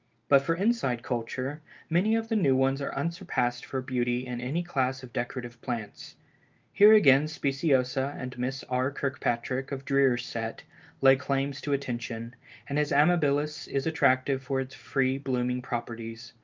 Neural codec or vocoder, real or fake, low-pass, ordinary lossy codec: none; real; 7.2 kHz; Opus, 24 kbps